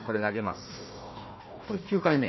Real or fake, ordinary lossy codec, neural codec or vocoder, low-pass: fake; MP3, 24 kbps; codec, 16 kHz, 1 kbps, FunCodec, trained on Chinese and English, 50 frames a second; 7.2 kHz